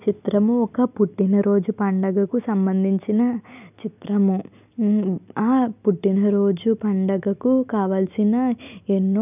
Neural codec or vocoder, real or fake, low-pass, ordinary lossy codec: none; real; 3.6 kHz; none